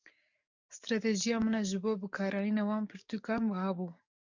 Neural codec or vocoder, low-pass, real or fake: codec, 44.1 kHz, 7.8 kbps, DAC; 7.2 kHz; fake